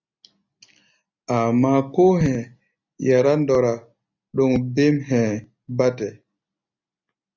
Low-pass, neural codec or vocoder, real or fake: 7.2 kHz; none; real